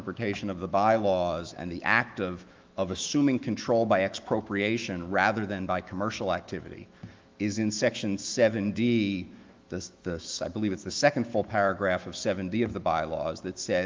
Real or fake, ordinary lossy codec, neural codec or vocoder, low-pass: fake; Opus, 32 kbps; autoencoder, 48 kHz, 128 numbers a frame, DAC-VAE, trained on Japanese speech; 7.2 kHz